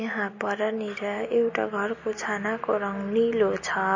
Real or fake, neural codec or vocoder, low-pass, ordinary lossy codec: real; none; 7.2 kHz; MP3, 32 kbps